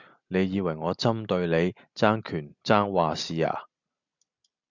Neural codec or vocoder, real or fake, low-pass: none; real; 7.2 kHz